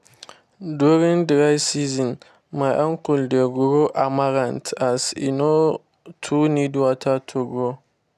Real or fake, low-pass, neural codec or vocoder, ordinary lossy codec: real; 14.4 kHz; none; none